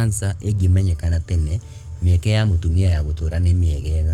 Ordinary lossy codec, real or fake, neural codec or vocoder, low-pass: none; fake; codec, 44.1 kHz, 7.8 kbps, Pupu-Codec; 19.8 kHz